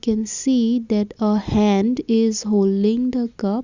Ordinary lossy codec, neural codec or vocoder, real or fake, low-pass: Opus, 64 kbps; none; real; 7.2 kHz